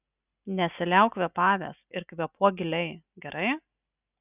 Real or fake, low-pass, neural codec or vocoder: real; 3.6 kHz; none